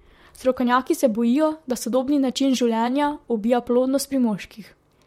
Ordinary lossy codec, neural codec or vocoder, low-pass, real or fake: MP3, 64 kbps; vocoder, 44.1 kHz, 128 mel bands, Pupu-Vocoder; 19.8 kHz; fake